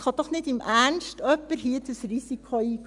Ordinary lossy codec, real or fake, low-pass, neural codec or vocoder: none; real; 10.8 kHz; none